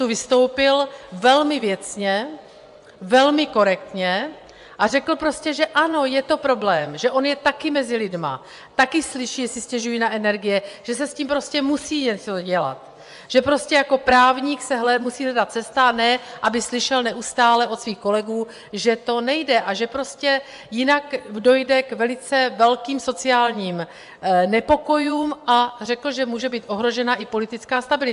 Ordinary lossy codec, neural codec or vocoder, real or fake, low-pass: AAC, 96 kbps; vocoder, 24 kHz, 100 mel bands, Vocos; fake; 10.8 kHz